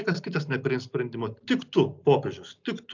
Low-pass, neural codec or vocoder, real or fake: 7.2 kHz; none; real